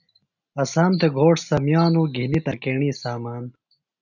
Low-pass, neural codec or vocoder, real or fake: 7.2 kHz; none; real